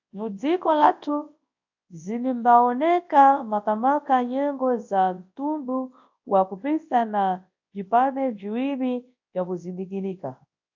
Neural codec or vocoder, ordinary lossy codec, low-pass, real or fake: codec, 24 kHz, 0.9 kbps, WavTokenizer, large speech release; AAC, 48 kbps; 7.2 kHz; fake